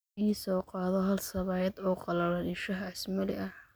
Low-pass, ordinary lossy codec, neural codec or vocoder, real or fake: none; none; none; real